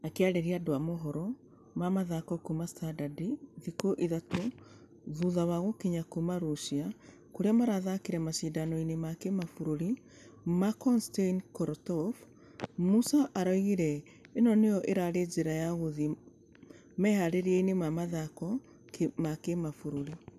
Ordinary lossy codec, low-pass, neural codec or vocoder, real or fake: none; 14.4 kHz; none; real